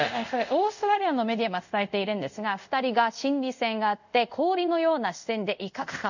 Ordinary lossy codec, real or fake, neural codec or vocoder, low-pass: none; fake; codec, 24 kHz, 0.5 kbps, DualCodec; 7.2 kHz